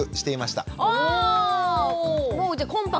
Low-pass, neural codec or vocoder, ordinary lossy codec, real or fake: none; none; none; real